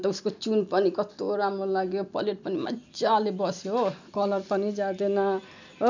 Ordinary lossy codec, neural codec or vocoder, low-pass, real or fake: none; none; 7.2 kHz; real